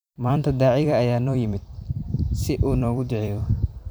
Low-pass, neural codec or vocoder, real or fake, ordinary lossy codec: none; vocoder, 44.1 kHz, 128 mel bands every 256 samples, BigVGAN v2; fake; none